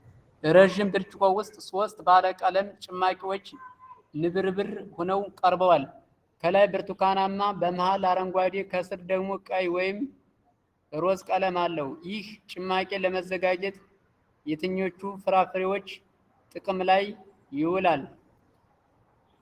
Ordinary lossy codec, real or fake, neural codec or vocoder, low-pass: Opus, 16 kbps; real; none; 14.4 kHz